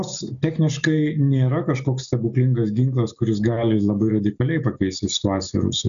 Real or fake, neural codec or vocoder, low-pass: real; none; 7.2 kHz